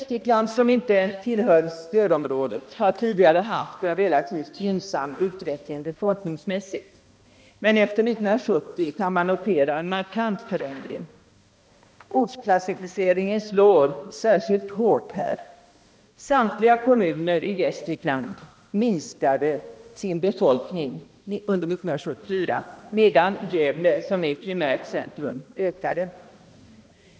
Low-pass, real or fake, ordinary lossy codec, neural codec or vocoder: none; fake; none; codec, 16 kHz, 1 kbps, X-Codec, HuBERT features, trained on balanced general audio